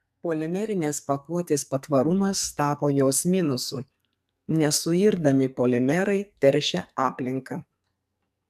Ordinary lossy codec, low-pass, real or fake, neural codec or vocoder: AAC, 96 kbps; 14.4 kHz; fake; codec, 44.1 kHz, 2.6 kbps, SNAC